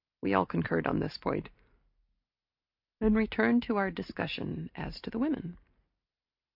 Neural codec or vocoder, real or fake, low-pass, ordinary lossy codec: none; real; 5.4 kHz; AAC, 48 kbps